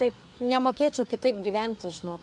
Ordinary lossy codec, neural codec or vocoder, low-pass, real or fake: MP3, 96 kbps; codec, 24 kHz, 1 kbps, SNAC; 10.8 kHz; fake